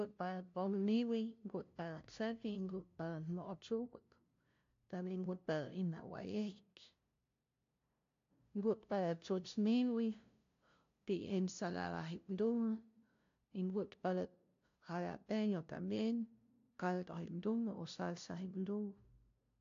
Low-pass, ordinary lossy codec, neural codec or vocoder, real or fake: 7.2 kHz; AAC, 48 kbps; codec, 16 kHz, 0.5 kbps, FunCodec, trained on LibriTTS, 25 frames a second; fake